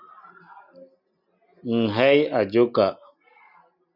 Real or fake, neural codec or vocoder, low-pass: real; none; 5.4 kHz